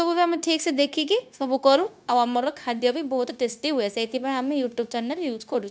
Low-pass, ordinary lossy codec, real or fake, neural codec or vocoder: none; none; fake; codec, 16 kHz, 0.9 kbps, LongCat-Audio-Codec